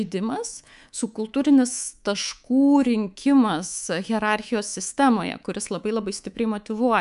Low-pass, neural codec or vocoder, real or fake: 10.8 kHz; codec, 24 kHz, 3.1 kbps, DualCodec; fake